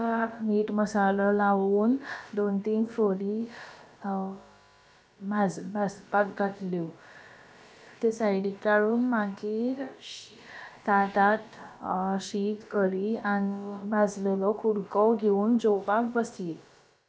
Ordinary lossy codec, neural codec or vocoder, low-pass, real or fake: none; codec, 16 kHz, about 1 kbps, DyCAST, with the encoder's durations; none; fake